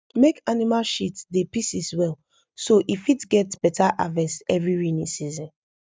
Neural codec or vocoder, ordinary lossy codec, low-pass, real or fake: none; none; none; real